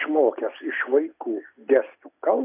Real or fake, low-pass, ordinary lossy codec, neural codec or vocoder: real; 3.6 kHz; AAC, 32 kbps; none